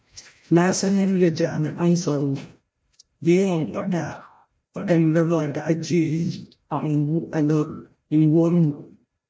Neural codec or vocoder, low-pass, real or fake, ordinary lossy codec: codec, 16 kHz, 0.5 kbps, FreqCodec, larger model; none; fake; none